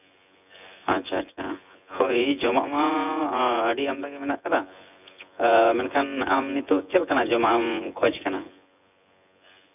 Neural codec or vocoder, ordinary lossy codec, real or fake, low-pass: vocoder, 24 kHz, 100 mel bands, Vocos; none; fake; 3.6 kHz